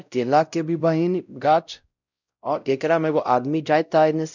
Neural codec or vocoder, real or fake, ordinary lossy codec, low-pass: codec, 16 kHz, 0.5 kbps, X-Codec, WavLM features, trained on Multilingual LibriSpeech; fake; none; 7.2 kHz